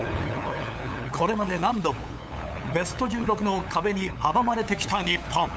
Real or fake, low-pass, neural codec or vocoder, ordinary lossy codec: fake; none; codec, 16 kHz, 8 kbps, FunCodec, trained on LibriTTS, 25 frames a second; none